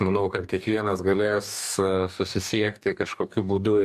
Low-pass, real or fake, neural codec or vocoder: 14.4 kHz; fake; codec, 44.1 kHz, 2.6 kbps, DAC